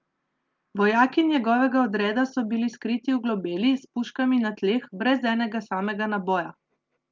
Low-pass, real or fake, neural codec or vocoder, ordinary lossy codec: 7.2 kHz; real; none; Opus, 32 kbps